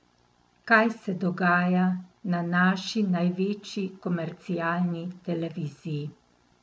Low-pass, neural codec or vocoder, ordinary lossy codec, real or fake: none; none; none; real